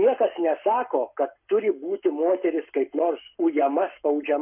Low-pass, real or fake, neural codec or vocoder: 3.6 kHz; fake; codec, 44.1 kHz, 7.8 kbps, Pupu-Codec